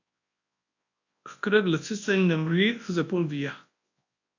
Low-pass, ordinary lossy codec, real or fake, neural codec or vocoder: 7.2 kHz; AAC, 48 kbps; fake; codec, 24 kHz, 0.9 kbps, WavTokenizer, large speech release